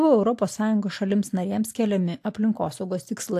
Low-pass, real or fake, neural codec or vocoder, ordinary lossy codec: 14.4 kHz; real; none; AAC, 64 kbps